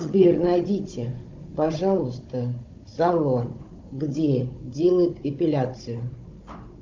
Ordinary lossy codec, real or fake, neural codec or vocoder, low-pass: Opus, 24 kbps; fake; codec, 16 kHz, 16 kbps, FunCodec, trained on Chinese and English, 50 frames a second; 7.2 kHz